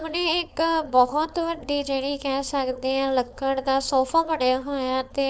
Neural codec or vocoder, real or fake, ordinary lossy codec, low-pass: codec, 16 kHz, 4.8 kbps, FACodec; fake; none; none